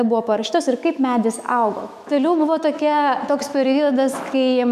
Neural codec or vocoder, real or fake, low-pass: autoencoder, 48 kHz, 128 numbers a frame, DAC-VAE, trained on Japanese speech; fake; 14.4 kHz